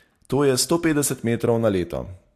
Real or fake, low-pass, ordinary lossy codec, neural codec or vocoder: real; 14.4 kHz; AAC, 64 kbps; none